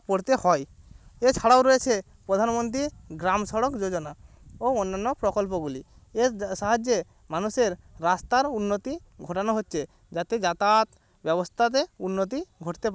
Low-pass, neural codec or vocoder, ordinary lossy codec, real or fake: none; none; none; real